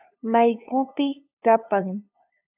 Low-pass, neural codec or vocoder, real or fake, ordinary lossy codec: 3.6 kHz; codec, 16 kHz, 2 kbps, FunCodec, trained on LibriTTS, 25 frames a second; fake; AAC, 32 kbps